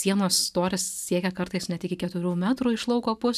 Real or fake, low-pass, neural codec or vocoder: real; 14.4 kHz; none